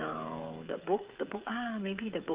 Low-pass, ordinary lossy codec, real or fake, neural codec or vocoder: 3.6 kHz; Opus, 32 kbps; fake; codec, 16 kHz, 8 kbps, FreqCodec, smaller model